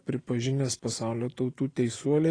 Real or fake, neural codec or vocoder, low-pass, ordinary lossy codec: real; none; 9.9 kHz; AAC, 32 kbps